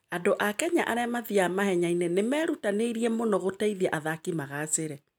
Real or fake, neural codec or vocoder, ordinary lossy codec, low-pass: real; none; none; none